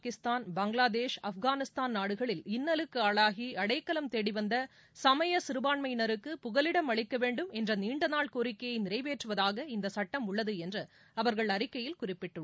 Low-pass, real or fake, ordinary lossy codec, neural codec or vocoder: none; real; none; none